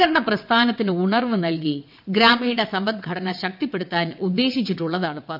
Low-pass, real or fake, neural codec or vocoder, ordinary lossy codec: 5.4 kHz; fake; vocoder, 22.05 kHz, 80 mel bands, WaveNeXt; none